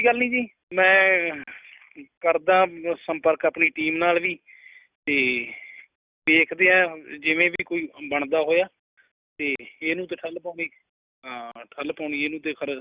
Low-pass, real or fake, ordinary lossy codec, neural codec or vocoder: 3.6 kHz; real; Opus, 64 kbps; none